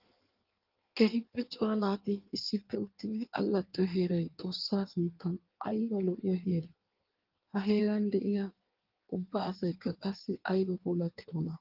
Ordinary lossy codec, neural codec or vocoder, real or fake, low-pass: Opus, 32 kbps; codec, 16 kHz in and 24 kHz out, 1.1 kbps, FireRedTTS-2 codec; fake; 5.4 kHz